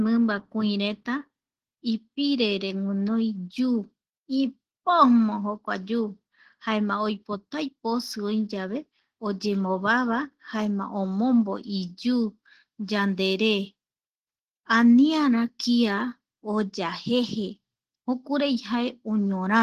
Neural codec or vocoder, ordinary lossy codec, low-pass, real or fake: none; Opus, 16 kbps; 14.4 kHz; real